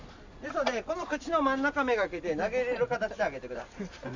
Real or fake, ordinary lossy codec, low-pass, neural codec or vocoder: real; MP3, 64 kbps; 7.2 kHz; none